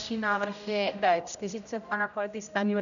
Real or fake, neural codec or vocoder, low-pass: fake; codec, 16 kHz, 0.5 kbps, X-Codec, HuBERT features, trained on general audio; 7.2 kHz